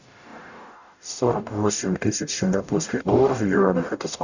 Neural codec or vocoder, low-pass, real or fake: codec, 44.1 kHz, 0.9 kbps, DAC; 7.2 kHz; fake